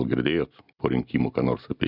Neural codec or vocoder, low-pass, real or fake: none; 5.4 kHz; real